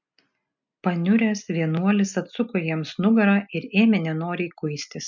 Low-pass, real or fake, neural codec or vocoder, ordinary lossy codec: 7.2 kHz; real; none; MP3, 64 kbps